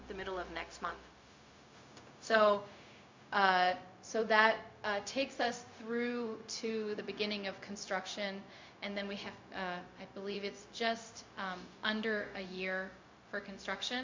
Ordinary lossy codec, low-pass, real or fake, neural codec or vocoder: MP3, 48 kbps; 7.2 kHz; fake; codec, 16 kHz, 0.4 kbps, LongCat-Audio-Codec